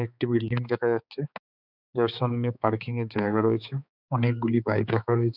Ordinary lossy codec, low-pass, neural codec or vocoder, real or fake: none; 5.4 kHz; codec, 16 kHz, 4 kbps, X-Codec, HuBERT features, trained on balanced general audio; fake